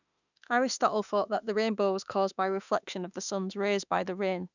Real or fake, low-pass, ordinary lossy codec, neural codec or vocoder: fake; 7.2 kHz; none; autoencoder, 48 kHz, 32 numbers a frame, DAC-VAE, trained on Japanese speech